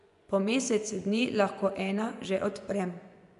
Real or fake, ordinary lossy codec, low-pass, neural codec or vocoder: fake; none; 10.8 kHz; vocoder, 24 kHz, 100 mel bands, Vocos